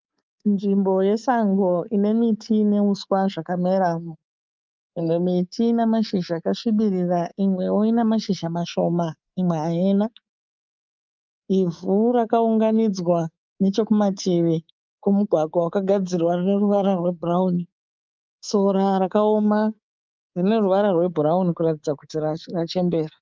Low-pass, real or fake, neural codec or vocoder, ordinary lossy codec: 7.2 kHz; fake; codec, 24 kHz, 3.1 kbps, DualCodec; Opus, 24 kbps